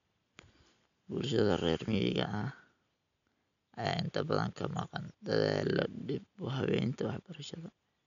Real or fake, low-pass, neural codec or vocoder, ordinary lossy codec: real; 7.2 kHz; none; none